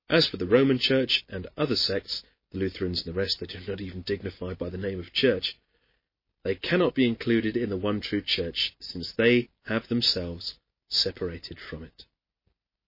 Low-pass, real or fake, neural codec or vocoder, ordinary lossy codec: 5.4 kHz; real; none; MP3, 24 kbps